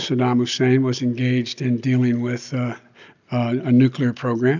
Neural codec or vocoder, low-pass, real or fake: none; 7.2 kHz; real